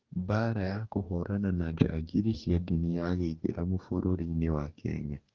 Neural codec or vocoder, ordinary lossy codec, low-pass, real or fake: codec, 44.1 kHz, 2.6 kbps, DAC; Opus, 24 kbps; 7.2 kHz; fake